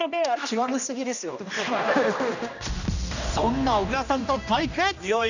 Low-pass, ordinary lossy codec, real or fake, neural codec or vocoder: 7.2 kHz; none; fake; codec, 16 kHz, 1 kbps, X-Codec, HuBERT features, trained on general audio